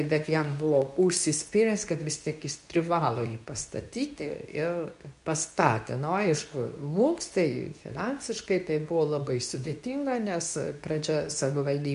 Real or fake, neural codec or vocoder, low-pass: fake; codec, 24 kHz, 0.9 kbps, WavTokenizer, medium speech release version 2; 10.8 kHz